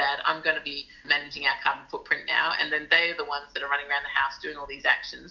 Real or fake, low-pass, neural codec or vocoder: real; 7.2 kHz; none